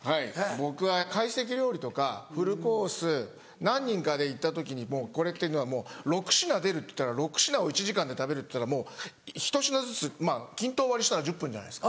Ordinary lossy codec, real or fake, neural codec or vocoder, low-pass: none; real; none; none